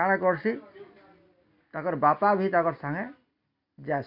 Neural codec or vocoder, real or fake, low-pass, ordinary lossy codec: none; real; 5.4 kHz; MP3, 48 kbps